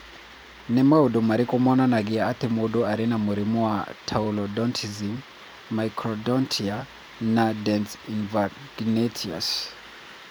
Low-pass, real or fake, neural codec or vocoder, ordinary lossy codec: none; fake; vocoder, 44.1 kHz, 128 mel bands every 512 samples, BigVGAN v2; none